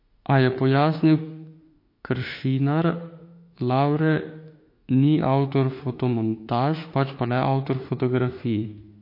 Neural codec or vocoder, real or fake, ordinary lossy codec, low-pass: autoencoder, 48 kHz, 32 numbers a frame, DAC-VAE, trained on Japanese speech; fake; MP3, 32 kbps; 5.4 kHz